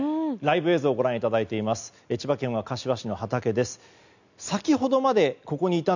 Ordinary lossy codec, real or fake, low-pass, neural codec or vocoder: none; real; 7.2 kHz; none